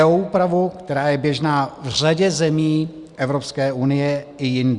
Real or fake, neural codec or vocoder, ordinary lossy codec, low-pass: real; none; Opus, 64 kbps; 10.8 kHz